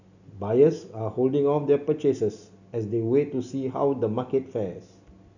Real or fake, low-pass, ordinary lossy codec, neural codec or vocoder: real; 7.2 kHz; none; none